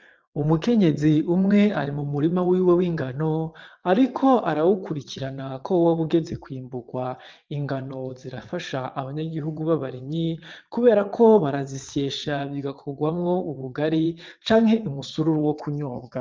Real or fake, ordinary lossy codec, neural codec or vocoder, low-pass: fake; Opus, 24 kbps; vocoder, 22.05 kHz, 80 mel bands, WaveNeXt; 7.2 kHz